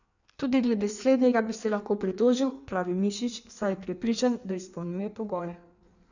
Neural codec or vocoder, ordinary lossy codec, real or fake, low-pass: codec, 16 kHz in and 24 kHz out, 1.1 kbps, FireRedTTS-2 codec; none; fake; 7.2 kHz